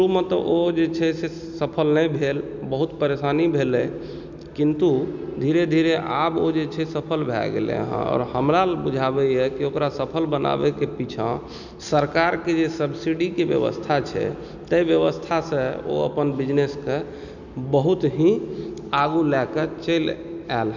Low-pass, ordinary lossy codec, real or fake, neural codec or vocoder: 7.2 kHz; none; real; none